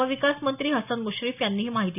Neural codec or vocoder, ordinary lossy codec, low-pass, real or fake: none; none; 3.6 kHz; real